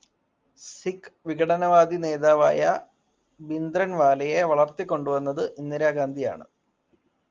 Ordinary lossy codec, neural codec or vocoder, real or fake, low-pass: Opus, 32 kbps; none; real; 7.2 kHz